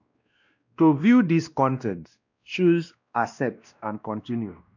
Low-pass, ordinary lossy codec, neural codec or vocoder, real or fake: 7.2 kHz; none; codec, 16 kHz, 1 kbps, X-Codec, WavLM features, trained on Multilingual LibriSpeech; fake